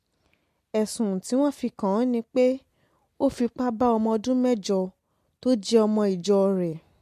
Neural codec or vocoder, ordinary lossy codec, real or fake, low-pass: none; MP3, 64 kbps; real; 14.4 kHz